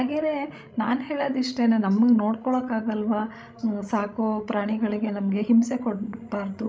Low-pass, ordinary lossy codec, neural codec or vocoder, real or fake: none; none; codec, 16 kHz, 16 kbps, FreqCodec, larger model; fake